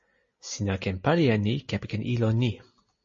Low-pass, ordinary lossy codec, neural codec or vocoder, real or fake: 7.2 kHz; MP3, 32 kbps; none; real